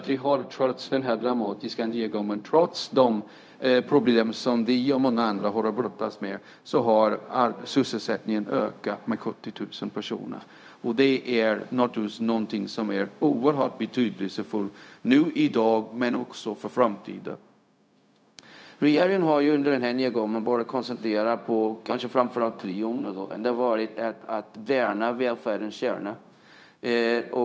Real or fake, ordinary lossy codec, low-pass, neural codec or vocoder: fake; none; none; codec, 16 kHz, 0.4 kbps, LongCat-Audio-Codec